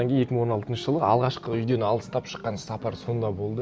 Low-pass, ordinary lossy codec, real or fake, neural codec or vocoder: none; none; real; none